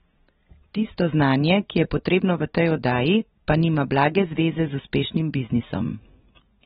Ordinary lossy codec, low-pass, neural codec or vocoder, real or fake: AAC, 16 kbps; 19.8 kHz; none; real